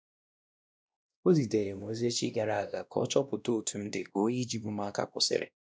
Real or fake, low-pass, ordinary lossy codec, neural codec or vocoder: fake; none; none; codec, 16 kHz, 1 kbps, X-Codec, WavLM features, trained on Multilingual LibriSpeech